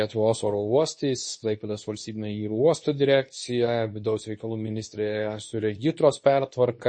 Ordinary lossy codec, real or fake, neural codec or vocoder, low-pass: MP3, 32 kbps; fake; codec, 24 kHz, 0.9 kbps, WavTokenizer, medium speech release version 2; 9.9 kHz